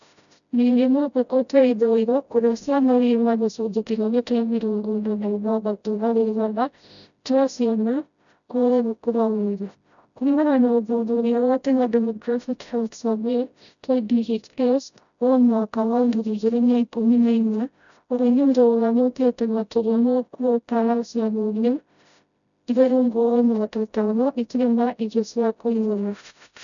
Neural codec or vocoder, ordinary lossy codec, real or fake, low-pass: codec, 16 kHz, 0.5 kbps, FreqCodec, smaller model; none; fake; 7.2 kHz